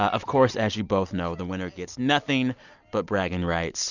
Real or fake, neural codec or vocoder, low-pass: real; none; 7.2 kHz